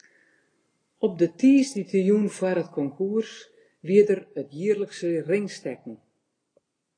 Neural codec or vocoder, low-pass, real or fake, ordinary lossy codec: none; 9.9 kHz; real; AAC, 32 kbps